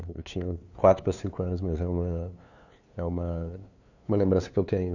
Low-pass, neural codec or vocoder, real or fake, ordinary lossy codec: 7.2 kHz; codec, 16 kHz, 2 kbps, FunCodec, trained on LibriTTS, 25 frames a second; fake; none